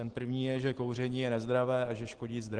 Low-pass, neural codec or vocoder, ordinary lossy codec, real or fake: 9.9 kHz; none; Opus, 16 kbps; real